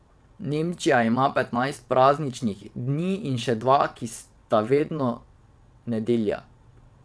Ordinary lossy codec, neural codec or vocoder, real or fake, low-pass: none; vocoder, 22.05 kHz, 80 mel bands, WaveNeXt; fake; none